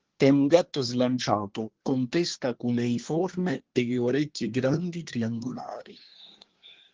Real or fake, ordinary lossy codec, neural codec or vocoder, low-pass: fake; Opus, 16 kbps; codec, 24 kHz, 1 kbps, SNAC; 7.2 kHz